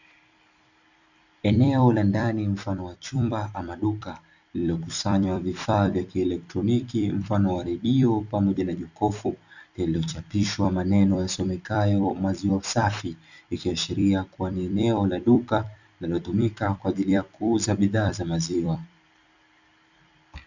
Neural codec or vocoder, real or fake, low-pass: vocoder, 44.1 kHz, 128 mel bands every 256 samples, BigVGAN v2; fake; 7.2 kHz